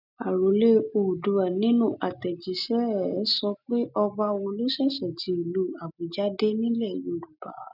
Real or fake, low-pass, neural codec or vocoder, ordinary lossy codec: real; 5.4 kHz; none; none